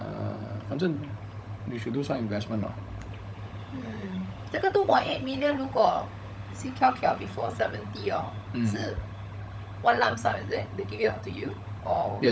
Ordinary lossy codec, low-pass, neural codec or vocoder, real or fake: none; none; codec, 16 kHz, 16 kbps, FunCodec, trained on LibriTTS, 50 frames a second; fake